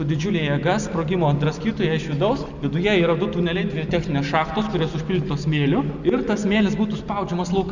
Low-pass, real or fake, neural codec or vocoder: 7.2 kHz; real; none